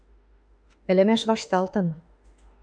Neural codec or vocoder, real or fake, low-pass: autoencoder, 48 kHz, 32 numbers a frame, DAC-VAE, trained on Japanese speech; fake; 9.9 kHz